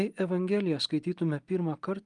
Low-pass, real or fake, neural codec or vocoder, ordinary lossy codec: 10.8 kHz; real; none; Opus, 32 kbps